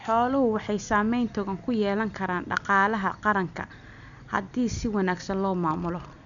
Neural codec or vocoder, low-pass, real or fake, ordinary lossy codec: none; 7.2 kHz; real; none